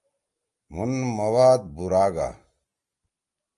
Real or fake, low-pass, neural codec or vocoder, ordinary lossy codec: real; 10.8 kHz; none; Opus, 32 kbps